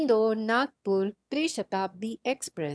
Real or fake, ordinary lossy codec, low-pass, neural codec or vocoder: fake; none; none; autoencoder, 22.05 kHz, a latent of 192 numbers a frame, VITS, trained on one speaker